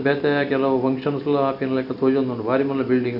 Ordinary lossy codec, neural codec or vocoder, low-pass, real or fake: none; none; 5.4 kHz; real